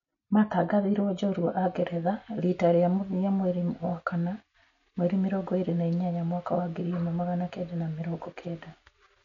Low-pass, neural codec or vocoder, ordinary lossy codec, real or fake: 7.2 kHz; none; none; real